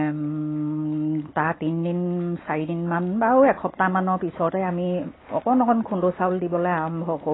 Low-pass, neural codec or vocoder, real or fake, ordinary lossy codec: 7.2 kHz; none; real; AAC, 16 kbps